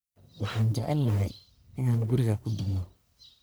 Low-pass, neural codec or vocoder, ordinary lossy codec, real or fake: none; codec, 44.1 kHz, 1.7 kbps, Pupu-Codec; none; fake